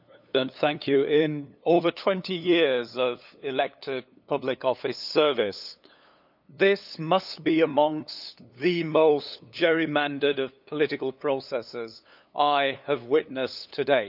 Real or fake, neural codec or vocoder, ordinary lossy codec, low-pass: fake; codec, 16 kHz, 8 kbps, FunCodec, trained on LibriTTS, 25 frames a second; none; 5.4 kHz